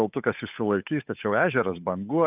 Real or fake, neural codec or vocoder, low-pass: fake; codec, 16 kHz, 8 kbps, FunCodec, trained on Chinese and English, 25 frames a second; 3.6 kHz